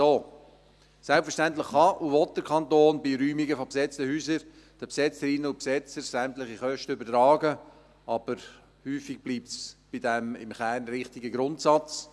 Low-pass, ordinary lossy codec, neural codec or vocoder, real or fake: none; none; none; real